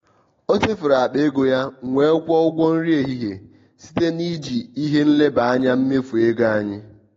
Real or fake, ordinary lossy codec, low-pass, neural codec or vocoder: real; AAC, 32 kbps; 7.2 kHz; none